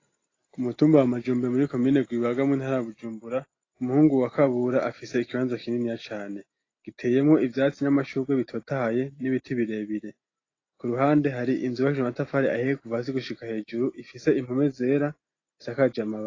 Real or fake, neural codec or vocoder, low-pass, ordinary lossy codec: real; none; 7.2 kHz; AAC, 32 kbps